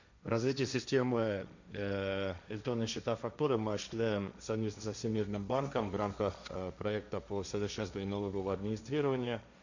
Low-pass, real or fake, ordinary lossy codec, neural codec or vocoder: 7.2 kHz; fake; none; codec, 16 kHz, 1.1 kbps, Voila-Tokenizer